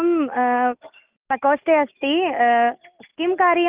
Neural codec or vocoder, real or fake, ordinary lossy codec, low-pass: none; real; Opus, 24 kbps; 3.6 kHz